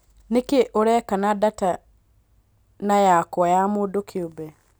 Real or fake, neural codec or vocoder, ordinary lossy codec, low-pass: real; none; none; none